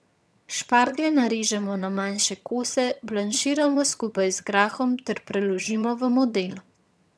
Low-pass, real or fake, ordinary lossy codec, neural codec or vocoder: none; fake; none; vocoder, 22.05 kHz, 80 mel bands, HiFi-GAN